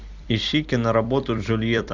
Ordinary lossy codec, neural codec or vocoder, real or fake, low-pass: Opus, 64 kbps; vocoder, 44.1 kHz, 128 mel bands every 256 samples, BigVGAN v2; fake; 7.2 kHz